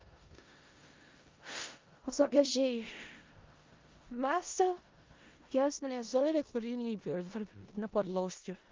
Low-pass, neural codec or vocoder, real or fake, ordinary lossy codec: 7.2 kHz; codec, 16 kHz in and 24 kHz out, 0.4 kbps, LongCat-Audio-Codec, four codebook decoder; fake; Opus, 24 kbps